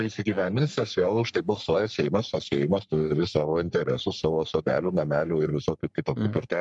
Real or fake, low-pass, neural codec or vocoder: fake; 10.8 kHz; codec, 44.1 kHz, 3.4 kbps, Pupu-Codec